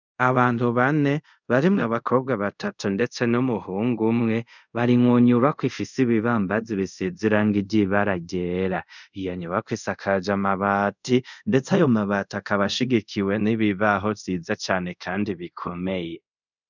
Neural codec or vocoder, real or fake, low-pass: codec, 24 kHz, 0.5 kbps, DualCodec; fake; 7.2 kHz